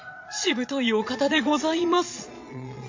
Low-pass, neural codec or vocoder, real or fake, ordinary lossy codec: 7.2 kHz; vocoder, 22.05 kHz, 80 mel bands, Vocos; fake; MP3, 48 kbps